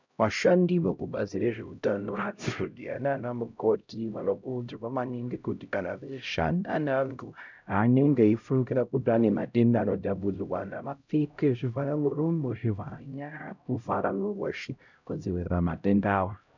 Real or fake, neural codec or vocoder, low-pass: fake; codec, 16 kHz, 0.5 kbps, X-Codec, HuBERT features, trained on LibriSpeech; 7.2 kHz